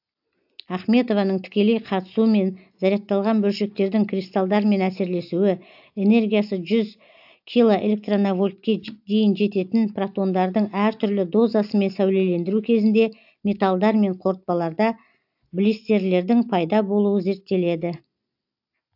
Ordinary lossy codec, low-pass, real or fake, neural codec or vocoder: AAC, 48 kbps; 5.4 kHz; real; none